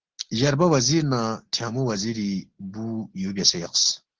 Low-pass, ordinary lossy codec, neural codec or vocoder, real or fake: 7.2 kHz; Opus, 16 kbps; none; real